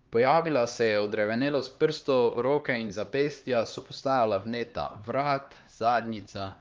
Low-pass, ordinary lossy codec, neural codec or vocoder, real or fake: 7.2 kHz; Opus, 32 kbps; codec, 16 kHz, 2 kbps, X-Codec, HuBERT features, trained on LibriSpeech; fake